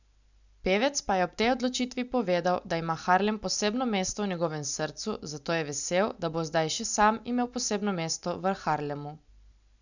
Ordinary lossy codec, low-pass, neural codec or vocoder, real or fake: none; 7.2 kHz; none; real